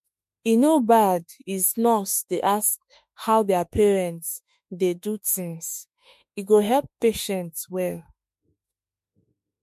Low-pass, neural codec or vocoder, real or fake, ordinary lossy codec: 14.4 kHz; autoencoder, 48 kHz, 32 numbers a frame, DAC-VAE, trained on Japanese speech; fake; MP3, 64 kbps